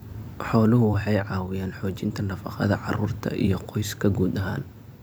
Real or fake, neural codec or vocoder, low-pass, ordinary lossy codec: fake; vocoder, 44.1 kHz, 128 mel bands every 256 samples, BigVGAN v2; none; none